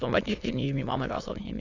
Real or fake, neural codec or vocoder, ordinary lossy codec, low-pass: fake; autoencoder, 22.05 kHz, a latent of 192 numbers a frame, VITS, trained on many speakers; AAC, 48 kbps; 7.2 kHz